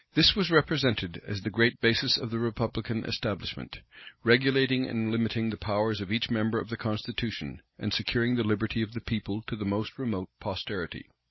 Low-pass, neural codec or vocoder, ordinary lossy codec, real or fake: 7.2 kHz; none; MP3, 24 kbps; real